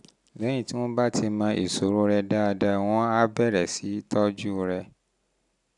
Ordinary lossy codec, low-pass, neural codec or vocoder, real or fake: none; 10.8 kHz; none; real